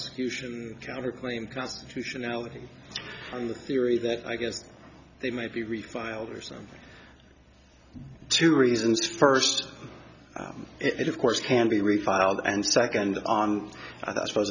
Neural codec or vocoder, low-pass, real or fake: none; 7.2 kHz; real